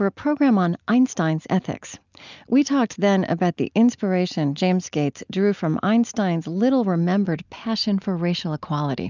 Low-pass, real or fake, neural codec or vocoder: 7.2 kHz; real; none